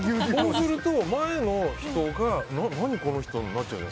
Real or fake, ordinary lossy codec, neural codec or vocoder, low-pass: real; none; none; none